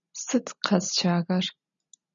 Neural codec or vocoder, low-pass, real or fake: none; 7.2 kHz; real